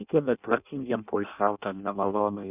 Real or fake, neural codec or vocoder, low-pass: fake; codec, 16 kHz in and 24 kHz out, 0.6 kbps, FireRedTTS-2 codec; 3.6 kHz